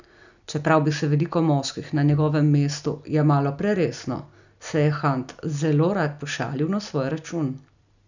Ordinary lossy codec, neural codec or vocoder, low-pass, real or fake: none; none; 7.2 kHz; real